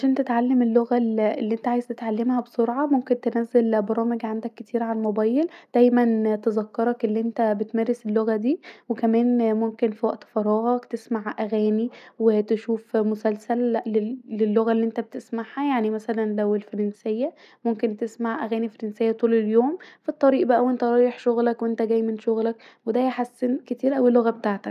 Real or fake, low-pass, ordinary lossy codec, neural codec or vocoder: real; 14.4 kHz; none; none